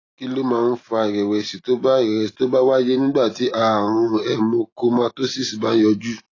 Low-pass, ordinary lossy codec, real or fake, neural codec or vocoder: 7.2 kHz; AAC, 32 kbps; real; none